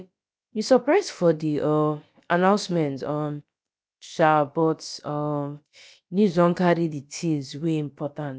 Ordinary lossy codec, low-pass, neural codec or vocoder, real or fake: none; none; codec, 16 kHz, about 1 kbps, DyCAST, with the encoder's durations; fake